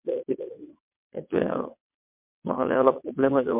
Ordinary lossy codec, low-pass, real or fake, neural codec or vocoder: MP3, 32 kbps; 3.6 kHz; fake; vocoder, 22.05 kHz, 80 mel bands, WaveNeXt